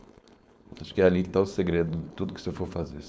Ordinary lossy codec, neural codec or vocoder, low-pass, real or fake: none; codec, 16 kHz, 4.8 kbps, FACodec; none; fake